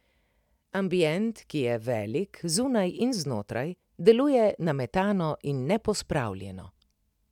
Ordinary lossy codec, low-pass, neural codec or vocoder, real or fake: none; 19.8 kHz; none; real